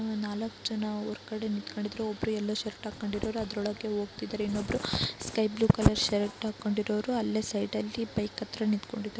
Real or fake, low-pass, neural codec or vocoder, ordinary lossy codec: real; none; none; none